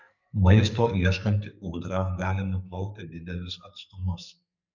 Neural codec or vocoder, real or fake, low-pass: codec, 44.1 kHz, 2.6 kbps, SNAC; fake; 7.2 kHz